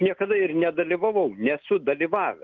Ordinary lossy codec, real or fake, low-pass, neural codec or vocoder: Opus, 24 kbps; real; 7.2 kHz; none